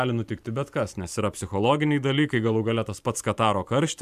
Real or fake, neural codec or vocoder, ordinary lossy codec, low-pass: fake; vocoder, 44.1 kHz, 128 mel bands every 512 samples, BigVGAN v2; AAC, 96 kbps; 14.4 kHz